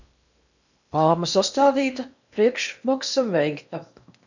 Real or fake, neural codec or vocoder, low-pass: fake; codec, 16 kHz in and 24 kHz out, 0.8 kbps, FocalCodec, streaming, 65536 codes; 7.2 kHz